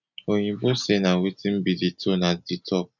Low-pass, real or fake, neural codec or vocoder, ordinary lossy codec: 7.2 kHz; real; none; none